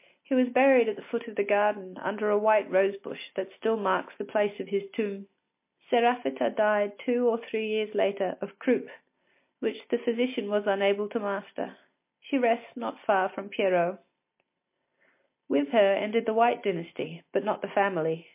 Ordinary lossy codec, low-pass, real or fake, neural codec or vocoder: MP3, 24 kbps; 3.6 kHz; real; none